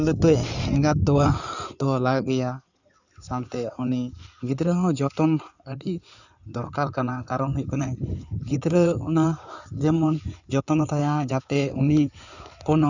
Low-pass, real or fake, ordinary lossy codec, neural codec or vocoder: 7.2 kHz; fake; none; codec, 16 kHz in and 24 kHz out, 2.2 kbps, FireRedTTS-2 codec